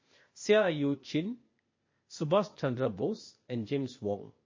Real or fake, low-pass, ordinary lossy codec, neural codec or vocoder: fake; 7.2 kHz; MP3, 32 kbps; codec, 16 kHz, 0.8 kbps, ZipCodec